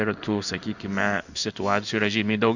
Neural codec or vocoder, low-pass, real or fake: codec, 16 kHz in and 24 kHz out, 1 kbps, XY-Tokenizer; 7.2 kHz; fake